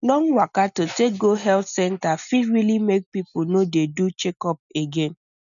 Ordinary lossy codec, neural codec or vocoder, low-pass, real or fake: none; none; 7.2 kHz; real